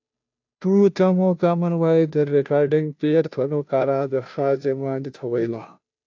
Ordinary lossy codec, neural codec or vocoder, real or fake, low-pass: AAC, 48 kbps; codec, 16 kHz, 0.5 kbps, FunCodec, trained on Chinese and English, 25 frames a second; fake; 7.2 kHz